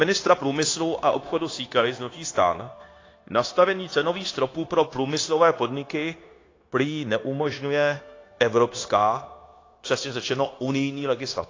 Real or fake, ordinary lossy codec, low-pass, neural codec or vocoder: fake; AAC, 32 kbps; 7.2 kHz; codec, 16 kHz, 0.9 kbps, LongCat-Audio-Codec